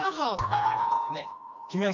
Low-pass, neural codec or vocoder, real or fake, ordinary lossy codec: 7.2 kHz; codec, 16 kHz, 2 kbps, FreqCodec, smaller model; fake; MP3, 64 kbps